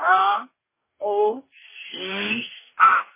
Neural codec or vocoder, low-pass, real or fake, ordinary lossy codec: codec, 44.1 kHz, 2.6 kbps, DAC; 3.6 kHz; fake; MP3, 16 kbps